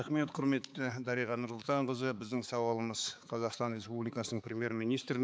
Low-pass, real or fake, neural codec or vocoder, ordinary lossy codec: none; fake; codec, 16 kHz, 4 kbps, X-Codec, HuBERT features, trained on balanced general audio; none